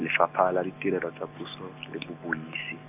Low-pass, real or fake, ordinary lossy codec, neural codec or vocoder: 3.6 kHz; real; none; none